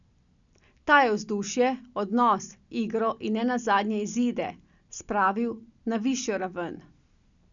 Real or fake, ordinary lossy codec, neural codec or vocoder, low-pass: real; none; none; 7.2 kHz